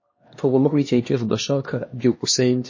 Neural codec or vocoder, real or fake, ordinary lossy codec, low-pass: codec, 16 kHz, 1 kbps, X-Codec, HuBERT features, trained on LibriSpeech; fake; MP3, 32 kbps; 7.2 kHz